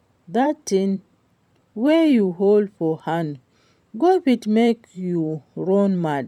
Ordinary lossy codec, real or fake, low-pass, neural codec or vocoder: none; fake; 19.8 kHz; vocoder, 44.1 kHz, 128 mel bands every 512 samples, BigVGAN v2